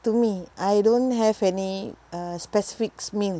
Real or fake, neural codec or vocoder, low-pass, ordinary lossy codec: real; none; none; none